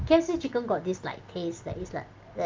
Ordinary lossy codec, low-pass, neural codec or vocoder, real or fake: Opus, 32 kbps; 7.2 kHz; none; real